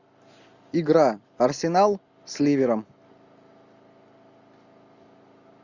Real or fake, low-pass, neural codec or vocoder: real; 7.2 kHz; none